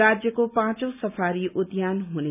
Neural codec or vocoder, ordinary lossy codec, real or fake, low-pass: none; none; real; 3.6 kHz